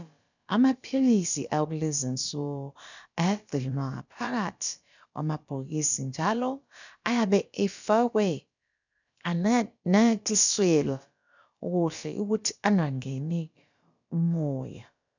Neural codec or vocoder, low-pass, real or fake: codec, 16 kHz, about 1 kbps, DyCAST, with the encoder's durations; 7.2 kHz; fake